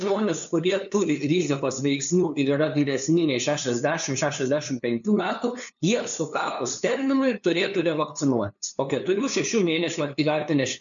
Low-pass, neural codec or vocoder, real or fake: 7.2 kHz; codec, 16 kHz, 2 kbps, FunCodec, trained on LibriTTS, 25 frames a second; fake